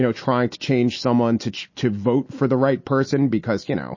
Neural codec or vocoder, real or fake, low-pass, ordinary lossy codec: none; real; 7.2 kHz; MP3, 32 kbps